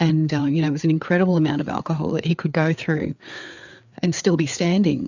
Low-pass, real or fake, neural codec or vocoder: 7.2 kHz; fake; codec, 16 kHz, 4 kbps, FreqCodec, larger model